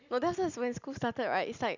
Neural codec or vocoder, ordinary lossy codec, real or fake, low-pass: none; none; real; 7.2 kHz